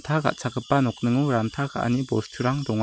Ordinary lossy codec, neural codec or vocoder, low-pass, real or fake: none; none; none; real